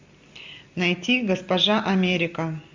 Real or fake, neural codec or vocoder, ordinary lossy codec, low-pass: real; none; MP3, 64 kbps; 7.2 kHz